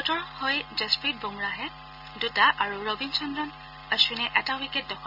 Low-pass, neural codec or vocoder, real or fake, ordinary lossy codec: 5.4 kHz; none; real; AAC, 48 kbps